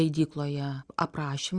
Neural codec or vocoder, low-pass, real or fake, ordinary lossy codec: none; 9.9 kHz; real; Opus, 64 kbps